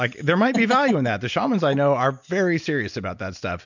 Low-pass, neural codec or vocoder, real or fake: 7.2 kHz; none; real